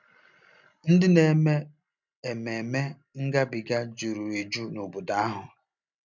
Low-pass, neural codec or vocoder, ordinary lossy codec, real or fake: 7.2 kHz; none; none; real